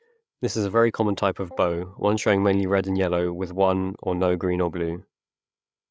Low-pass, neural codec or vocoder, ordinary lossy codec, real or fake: none; codec, 16 kHz, 8 kbps, FreqCodec, larger model; none; fake